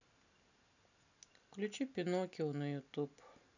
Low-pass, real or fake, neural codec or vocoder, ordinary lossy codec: 7.2 kHz; real; none; none